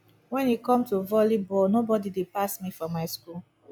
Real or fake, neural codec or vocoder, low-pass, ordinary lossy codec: real; none; none; none